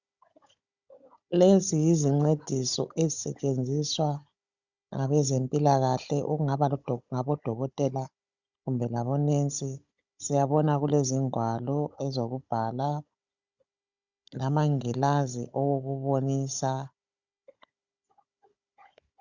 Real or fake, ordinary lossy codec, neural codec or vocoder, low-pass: fake; Opus, 64 kbps; codec, 16 kHz, 16 kbps, FunCodec, trained on Chinese and English, 50 frames a second; 7.2 kHz